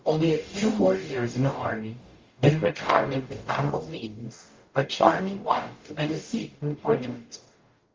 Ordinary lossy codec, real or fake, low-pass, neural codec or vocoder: Opus, 32 kbps; fake; 7.2 kHz; codec, 44.1 kHz, 0.9 kbps, DAC